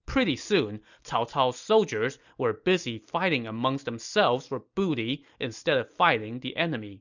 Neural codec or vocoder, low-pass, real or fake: none; 7.2 kHz; real